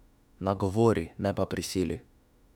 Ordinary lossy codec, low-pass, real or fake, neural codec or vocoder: none; 19.8 kHz; fake; autoencoder, 48 kHz, 32 numbers a frame, DAC-VAE, trained on Japanese speech